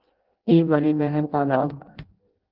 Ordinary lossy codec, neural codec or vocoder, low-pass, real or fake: Opus, 16 kbps; codec, 16 kHz in and 24 kHz out, 0.6 kbps, FireRedTTS-2 codec; 5.4 kHz; fake